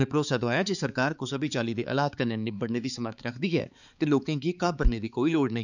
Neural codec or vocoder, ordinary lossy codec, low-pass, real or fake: codec, 16 kHz, 4 kbps, X-Codec, HuBERT features, trained on balanced general audio; none; 7.2 kHz; fake